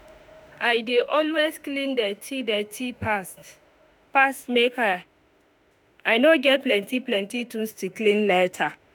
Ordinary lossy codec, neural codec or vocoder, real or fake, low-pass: none; autoencoder, 48 kHz, 32 numbers a frame, DAC-VAE, trained on Japanese speech; fake; none